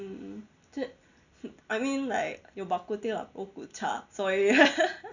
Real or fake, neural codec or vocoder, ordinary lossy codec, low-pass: real; none; none; 7.2 kHz